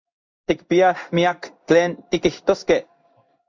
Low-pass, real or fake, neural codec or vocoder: 7.2 kHz; fake; codec, 16 kHz in and 24 kHz out, 1 kbps, XY-Tokenizer